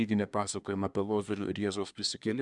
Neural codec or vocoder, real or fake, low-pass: codec, 24 kHz, 1 kbps, SNAC; fake; 10.8 kHz